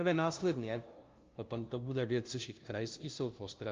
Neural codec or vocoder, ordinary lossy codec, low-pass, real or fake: codec, 16 kHz, 0.5 kbps, FunCodec, trained on LibriTTS, 25 frames a second; Opus, 24 kbps; 7.2 kHz; fake